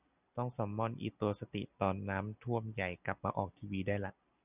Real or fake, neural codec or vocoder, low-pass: real; none; 3.6 kHz